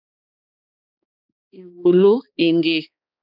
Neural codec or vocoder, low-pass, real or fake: codec, 16 kHz, 2 kbps, X-Codec, HuBERT features, trained on balanced general audio; 5.4 kHz; fake